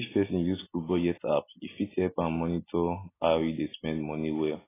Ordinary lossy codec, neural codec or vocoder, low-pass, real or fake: AAC, 16 kbps; none; 3.6 kHz; real